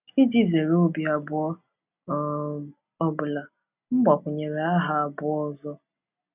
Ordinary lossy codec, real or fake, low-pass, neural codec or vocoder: none; real; 3.6 kHz; none